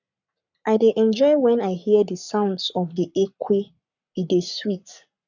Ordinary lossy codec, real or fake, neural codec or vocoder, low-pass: none; fake; codec, 44.1 kHz, 7.8 kbps, Pupu-Codec; 7.2 kHz